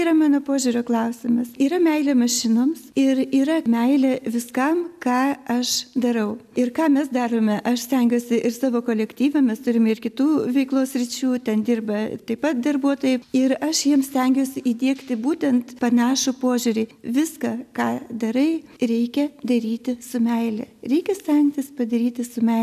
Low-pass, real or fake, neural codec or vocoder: 14.4 kHz; real; none